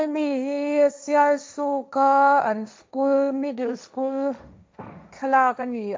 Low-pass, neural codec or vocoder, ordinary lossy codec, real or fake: none; codec, 16 kHz, 1.1 kbps, Voila-Tokenizer; none; fake